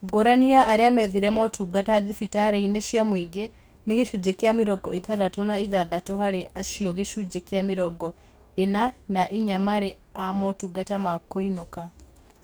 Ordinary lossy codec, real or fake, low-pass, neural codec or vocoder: none; fake; none; codec, 44.1 kHz, 2.6 kbps, DAC